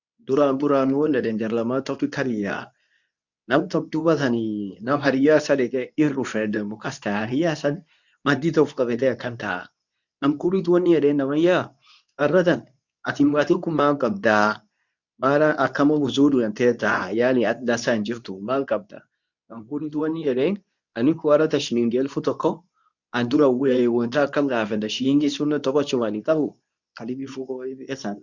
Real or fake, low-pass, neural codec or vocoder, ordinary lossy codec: fake; 7.2 kHz; codec, 24 kHz, 0.9 kbps, WavTokenizer, medium speech release version 2; none